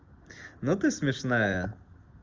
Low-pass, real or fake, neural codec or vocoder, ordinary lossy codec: 7.2 kHz; real; none; Opus, 32 kbps